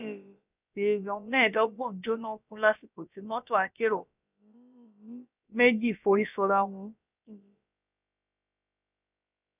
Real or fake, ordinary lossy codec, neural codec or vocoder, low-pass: fake; none; codec, 16 kHz, about 1 kbps, DyCAST, with the encoder's durations; 3.6 kHz